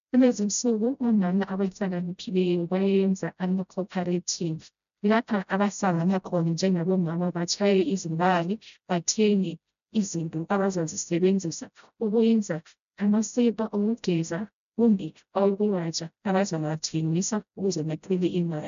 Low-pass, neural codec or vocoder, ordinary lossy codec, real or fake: 7.2 kHz; codec, 16 kHz, 0.5 kbps, FreqCodec, smaller model; MP3, 96 kbps; fake